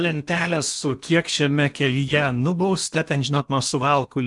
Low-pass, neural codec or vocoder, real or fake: 10.8 kHz; codec, 16 kHz in and 24 kHz out, 0.8 kbps, FocalCodec, streaming, 65536 codes; fake